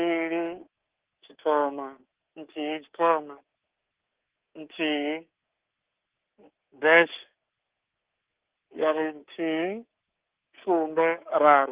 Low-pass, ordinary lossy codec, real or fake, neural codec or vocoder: 3.6 kHz; Opus, 16 kbps; fake; codec, 24 kHz, 3.1 kbps, DualCodec